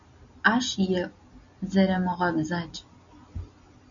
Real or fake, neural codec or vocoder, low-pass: real; none; 7.2 kHz